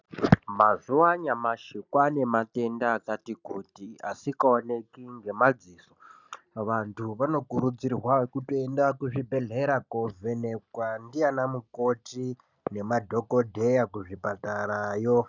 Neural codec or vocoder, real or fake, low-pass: none; real; 7.2 kHz